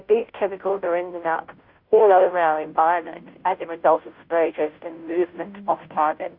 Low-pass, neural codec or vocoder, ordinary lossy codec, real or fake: 5.4 kHz; codec, 16 kHz, 0.5 kbps, FunCodec, trained on Chinese and English, 25 frames a second; Opus, 64 kbps; fake